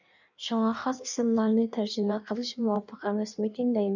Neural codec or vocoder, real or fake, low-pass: codec, 16 kHz in and 24 kHz out, 1.1 kbps, FireRedTTS-2 codec; fake; 7.2 kHz